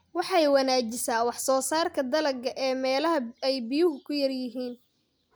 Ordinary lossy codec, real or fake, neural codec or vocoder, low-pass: none; real; none; none